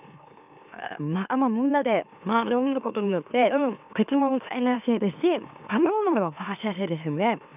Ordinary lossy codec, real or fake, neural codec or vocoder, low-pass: none; fake; autoencoder, 44.1 kHz, a latent of 192 numbers a frame, MeloTTS; 3.6 kHz